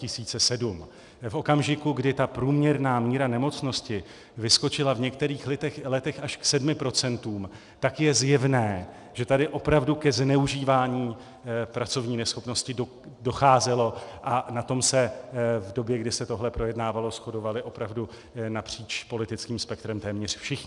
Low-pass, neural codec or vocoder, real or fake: 10.8 kHz; none; real